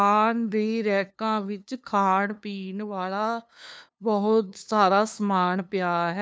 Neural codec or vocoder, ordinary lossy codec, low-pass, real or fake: codec, 16 kHz, 2 kbps, FunCodec, trained on LibriTTS, 25 frames a second; none; none; fake